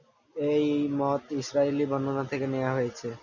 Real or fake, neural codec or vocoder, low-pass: real; none; 7.2 kHz